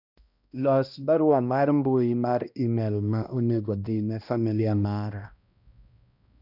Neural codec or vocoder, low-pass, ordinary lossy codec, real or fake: codec, 16 kHz, 1 kbps, X-Codec, HuBERT features, trained on balanced general audio; 5.4 kHz; none; fake